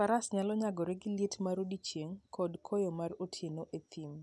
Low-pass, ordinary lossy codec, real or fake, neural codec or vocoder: none; none; real; none